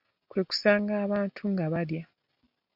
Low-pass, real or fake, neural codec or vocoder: 5.4 kHz; real; none